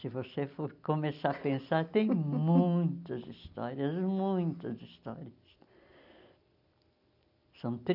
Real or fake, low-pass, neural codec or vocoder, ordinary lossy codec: real; 5.4 kHz; none; none